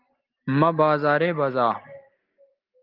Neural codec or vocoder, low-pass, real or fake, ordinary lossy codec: none; 5.4 kHz; real; Opus, 32 kbps